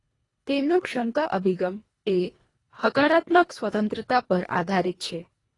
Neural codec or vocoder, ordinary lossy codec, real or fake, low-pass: codec, 24 kHz, 1.5 kbps, HILCodec; AAC, 32 kbps; fake; 10.8 kHz